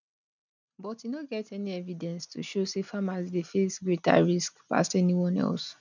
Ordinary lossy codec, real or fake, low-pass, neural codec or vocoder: none; real; 7.2 kHz; none